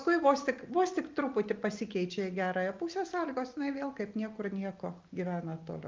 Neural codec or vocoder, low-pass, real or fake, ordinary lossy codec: none; 7.2 kHz; real; Opus, 32 kbps